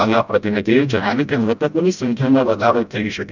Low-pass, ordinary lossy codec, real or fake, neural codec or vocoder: 7.2 kHz; none; fake; codec, 16 kHz, 0.5 kbps, FreqCodec, smaller model